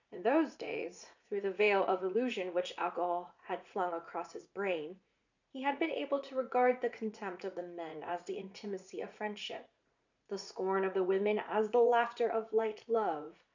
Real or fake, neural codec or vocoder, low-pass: fake; vocoder, 44.1 kHz, 80 mel bands, Vocos; 7.2 kHz